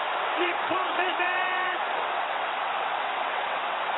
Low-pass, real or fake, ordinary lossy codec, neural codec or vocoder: 7.2 kHz; fake; AAC, 16 kbps; vocoder, 22.05 kHz, 80 mel bands, WaveNeXt